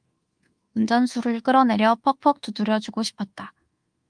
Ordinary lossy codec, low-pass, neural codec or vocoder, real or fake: Opus, 24 kbps; 9.9 kHz; codec, 24 kHz, 1.2 kbps, DualCodec; fake